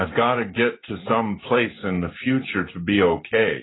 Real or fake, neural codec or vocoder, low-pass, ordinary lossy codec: real; none; 7.2 kHz; AAC, 16 kbps